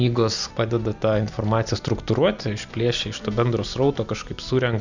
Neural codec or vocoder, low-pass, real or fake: none; 7.2 kHz; real